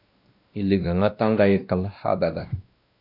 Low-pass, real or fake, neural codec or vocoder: 5.4 kHz; fake; codec, 16 kHz, 1 kbps, X-Codec, WavLM features, trained on Multilingual LibriSpeech